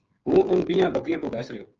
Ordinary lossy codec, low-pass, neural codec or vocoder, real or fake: Opus, 24 kbps; 7.2 kHz; codec, 16 kHz, 8 kbps, FreqCodec, smaller model; fake